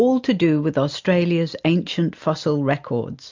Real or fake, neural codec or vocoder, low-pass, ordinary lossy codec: real; none; 7.2 kHz; MP3, 64 kbps